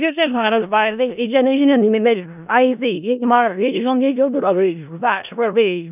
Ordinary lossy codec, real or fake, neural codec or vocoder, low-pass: none; fake; codec, 16 kHz in and 24 kHz out, 0.4 kbps, LongCat-Audio-Codec, four codebook decoder; 3.6 kHz